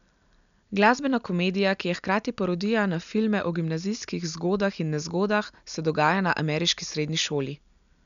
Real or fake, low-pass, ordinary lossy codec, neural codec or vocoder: real; 7.2 kHz; none; none